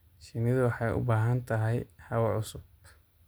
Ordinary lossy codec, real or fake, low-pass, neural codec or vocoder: none; real; none; none